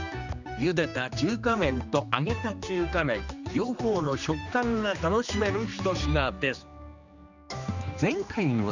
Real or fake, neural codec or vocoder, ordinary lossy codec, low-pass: fake; codec, 16 kHz, 2 kbps, X-Codec, HuBERT features, trained on general audio; none; 7.2 kHz